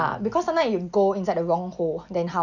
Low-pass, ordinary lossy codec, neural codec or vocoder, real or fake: 7.2 kHz; none; none; real